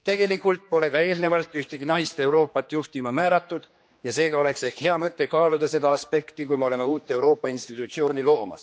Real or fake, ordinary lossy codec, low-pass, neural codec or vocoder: fake; none; none; codec, 16 kHz, 2 kbps, X-Codec, HuBERT features, trained on general audio